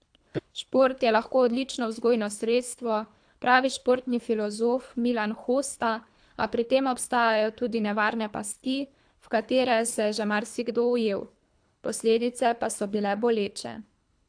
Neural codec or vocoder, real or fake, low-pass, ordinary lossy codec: codec, 24 kHz, 3 kbps, HILCodec; fake; 9.9 kHz; AAC, 64 kbps